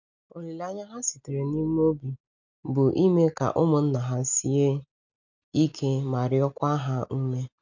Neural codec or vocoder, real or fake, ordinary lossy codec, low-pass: none; real; none; 7.2 kHz